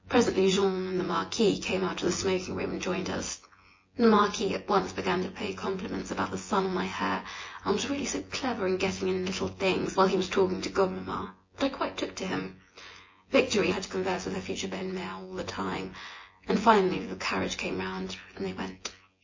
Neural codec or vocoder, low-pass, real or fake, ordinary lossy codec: vocoder, 24 kHz, 100 mel bands, Vocos; 7.2 kHz; fake; MP3, 32 kbps